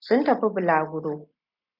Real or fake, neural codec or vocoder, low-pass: real; none; 5.4 kHz